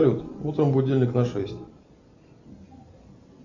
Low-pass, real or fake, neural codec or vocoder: 7.2 kHz; real; none